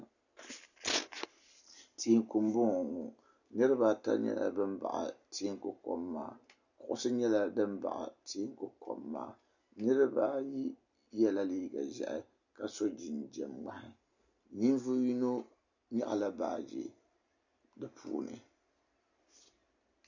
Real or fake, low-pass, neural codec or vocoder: real; 7.2 kHz; none